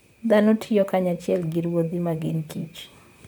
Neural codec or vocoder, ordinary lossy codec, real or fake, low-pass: vocoder, 44.1 kHz, 128 mel bands, Pupu-Vocoder; none; fake; none